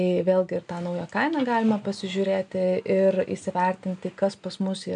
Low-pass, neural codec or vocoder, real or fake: 9.9 kHz; none; real